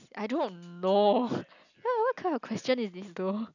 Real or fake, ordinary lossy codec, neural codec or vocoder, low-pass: real; none; none; 7.2 kHz